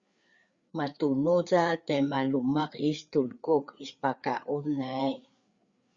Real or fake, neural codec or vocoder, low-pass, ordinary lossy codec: fake; codec, 16 kHz, 4 kbps, FreqCodec, larger model; 7.2 kHz; Opus, 64 kbps